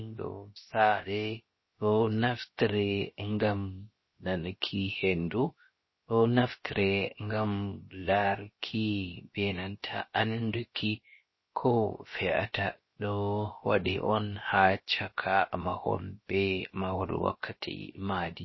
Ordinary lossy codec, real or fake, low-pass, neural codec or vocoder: MP3, 24 kbps; fake; 7.2 kHz; codec, 16 kHz, about 1 kbps, DyCAST, with the encoder's durations